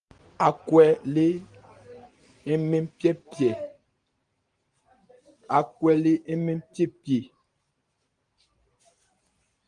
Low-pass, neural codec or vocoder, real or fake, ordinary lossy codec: 9.9 kHz; none; real; Opus, 24 kbps